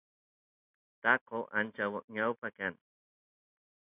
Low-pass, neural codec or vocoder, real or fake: 3.6 kHz; none; real